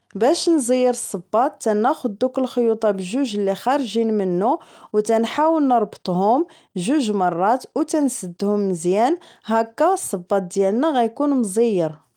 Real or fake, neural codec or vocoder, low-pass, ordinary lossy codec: real; none; 19.8 kHz; Opus, 32 kbps